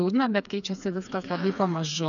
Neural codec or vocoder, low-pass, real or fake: codec, 16 kHz, 4 kbps, FreqCodec, smaller model; 7.2 kHz; fake